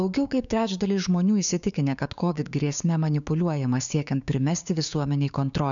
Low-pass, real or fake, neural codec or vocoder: 7.2 kHz; fake; codec, 16 kHz, 6 kbps, DAC